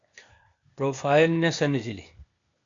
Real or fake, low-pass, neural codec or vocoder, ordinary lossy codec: fake; 7.2 kHz; codec, 16 kHz, 0.8 kbps, ZipCodec; MP3, 64 kbps